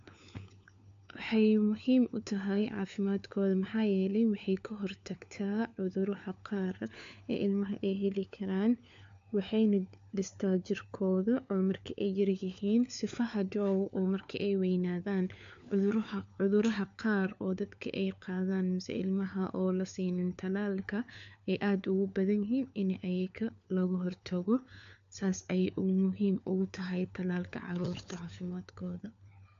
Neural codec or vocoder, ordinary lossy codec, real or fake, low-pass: codec, 16 kHz, 4 kbps, FunCodec, trained on LibriTTS, 50 frames a second; AAC, 64 kbps; fake; 7.2 kHz